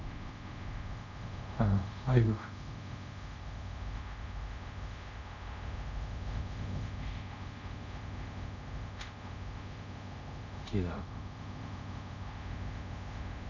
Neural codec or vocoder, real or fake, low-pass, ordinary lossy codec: codec, 24 kHz, 0.5 kbps, DualCodec; fake; 7.2 kHz; none